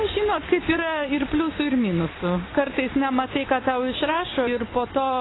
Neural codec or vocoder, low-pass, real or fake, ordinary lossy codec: none; 7.2 kHz; real; AAC, 16 kbps